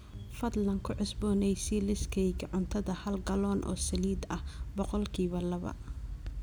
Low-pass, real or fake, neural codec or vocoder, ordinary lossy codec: none; real; none; none